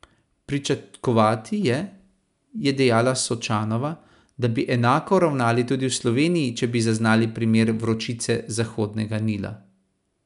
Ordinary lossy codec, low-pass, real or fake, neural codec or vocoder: none; 10.8 kHz; real; none